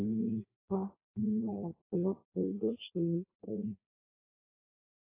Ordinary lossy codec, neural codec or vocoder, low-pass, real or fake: AAC, 24 kbps; codec, 16 kHz in and 24 kHz out, 0.6 kbps, FireRedTTS-2 codec; 3.6 kHz; fake